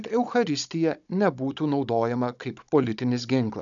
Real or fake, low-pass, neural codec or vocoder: fake; 7.2 kHz; codec, 16 kHz, 16 kbps, FunCodec, trained on Chinese and English, 50 frames a second